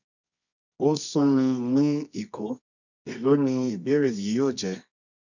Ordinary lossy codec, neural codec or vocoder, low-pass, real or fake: none; codec, 24 kHz, 0.9 kbps, WavTokenizer, medium music audio release; 7.2 kHz; fake